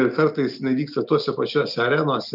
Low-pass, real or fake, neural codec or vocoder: 5.4 kHz; real; none